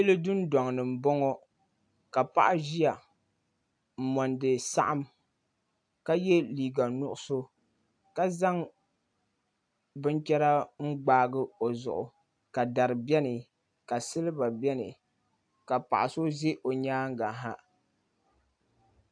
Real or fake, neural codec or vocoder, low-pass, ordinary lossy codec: real; none; 9.9 kHz; AAC, 64 kbps